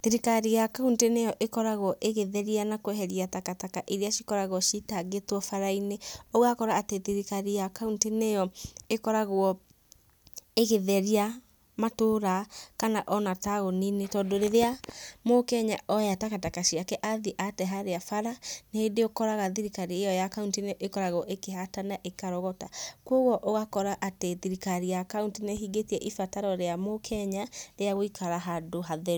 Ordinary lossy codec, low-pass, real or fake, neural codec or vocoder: none; none; real; none